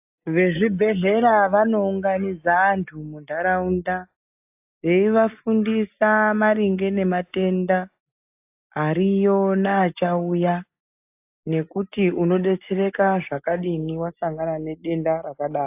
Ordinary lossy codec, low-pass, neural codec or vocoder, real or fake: AAC, 32 kbps; 3.6 kHz; none; real